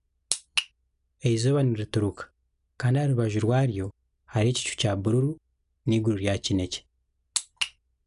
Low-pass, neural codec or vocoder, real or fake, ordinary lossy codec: 10.8 kHz; none; real; none